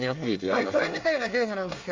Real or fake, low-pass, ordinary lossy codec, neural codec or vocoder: fake; 7.2 kHz; Opus, 32 kbps; codec, 24 kHz, 1 kbps, SNAC